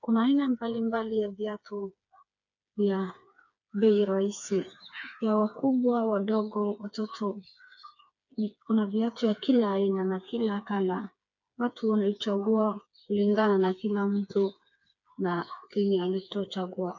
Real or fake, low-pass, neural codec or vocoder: fake; 7.2 kHz; codec, 16 kHz, 4 kbps, FreqCodec, smaller model